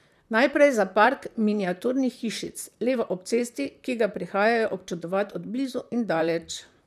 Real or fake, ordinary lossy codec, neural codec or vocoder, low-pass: fake; none; vocoder, 44.1 kHz, 128 mel bands, Pupu-Vocoder; 14.4 kHz